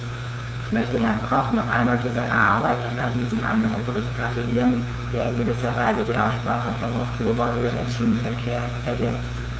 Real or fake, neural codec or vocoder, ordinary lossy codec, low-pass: fake; codec, 16 kHz, 2 kbps, FunCodec, trained on LibriTTS, 25 frames a second; none; none